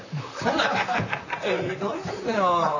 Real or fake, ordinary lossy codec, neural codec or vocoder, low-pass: fake; none; vocoder, 44.1 kHz, 128 mel bands, Pupu-Vocoder; 7.2 kHz